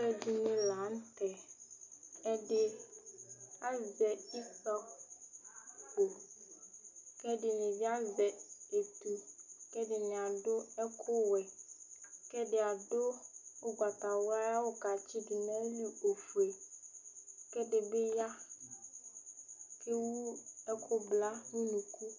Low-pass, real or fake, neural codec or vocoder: 7.2 kHz; real; none